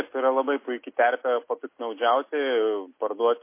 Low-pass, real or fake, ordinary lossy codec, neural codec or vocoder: 3.6 kHz; real; MP3, 24 kbps; none